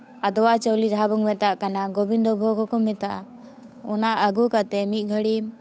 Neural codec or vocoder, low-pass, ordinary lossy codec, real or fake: codec, 16 kHz, 8 kbps, FunCodec, trained on Chinese and English, 25 frames a second; none; none; fake